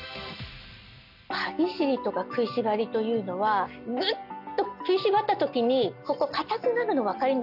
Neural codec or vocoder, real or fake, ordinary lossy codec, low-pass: none; real; none; 5.4 kHz